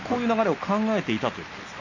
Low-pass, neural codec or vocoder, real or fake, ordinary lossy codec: 7.2 kHz; none; real; none